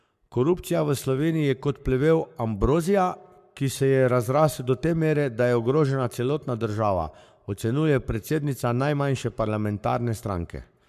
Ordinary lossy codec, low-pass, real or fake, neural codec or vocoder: MP3, 96 kbps; 14.4 kHz; fake; codec, 44.1 kHz, 7.8 kbps, Pupu-Codec